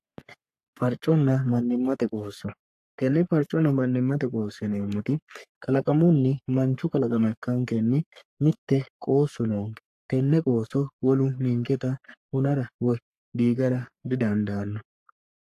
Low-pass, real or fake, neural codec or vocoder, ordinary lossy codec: 14.4 kHz; fake; codec, 44.1 kHz, 3.4 kbps, Pupu-Codec; AAC, 64 kbps